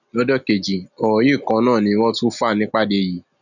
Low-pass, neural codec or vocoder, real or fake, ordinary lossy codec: 7.2 kHz; none; real; none